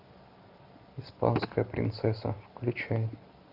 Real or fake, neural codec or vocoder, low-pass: real; none; 5.4 kHz